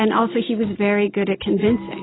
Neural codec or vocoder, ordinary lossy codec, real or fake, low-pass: none; AAC, 16 kbps; real; 7.2 kHz